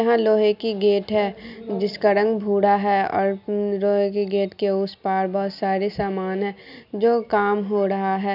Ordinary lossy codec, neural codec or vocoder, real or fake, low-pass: none; none; real; 5.4 kHz